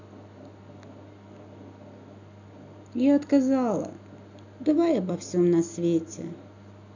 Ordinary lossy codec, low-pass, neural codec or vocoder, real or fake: none; 7.2 kHz; none; real